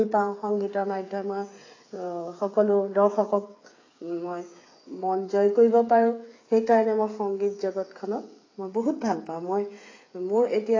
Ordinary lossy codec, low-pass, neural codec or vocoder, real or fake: AAC, 32 kbps; 7.2 kHz; codec, 16 kHz, 8 kbps, FreqCodec, smaller model; fake